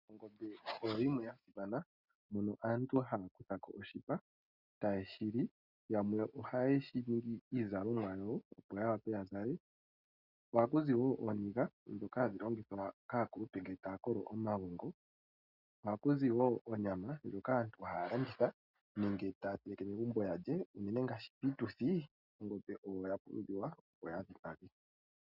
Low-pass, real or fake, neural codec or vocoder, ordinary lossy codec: 5.4 kHz; real; none; Opus, 64 kbps